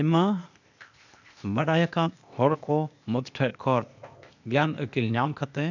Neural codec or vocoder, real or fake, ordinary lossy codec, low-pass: codec, 16 kHz, 0.8 kbps, ZipCodec; fake; none; 7.2 kHz